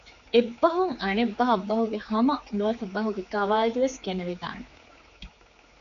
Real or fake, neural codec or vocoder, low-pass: fake; codec, 16 kHz, 4 kbps, X-Codec, HuBERT features, trained on general audio; 7.2 kHz